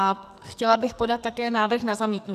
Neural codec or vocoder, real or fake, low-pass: codec, 44.1 kHz, 2.6 kbps, SNAC; fake; 14.4 kHz